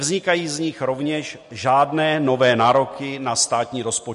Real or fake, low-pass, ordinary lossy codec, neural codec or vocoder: real; 14.4 kHz; MP3, 48 kbps; none